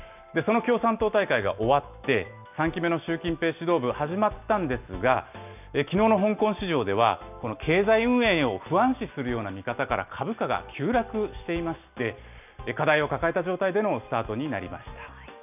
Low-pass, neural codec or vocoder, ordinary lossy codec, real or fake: 3.6 kHz; none; none; real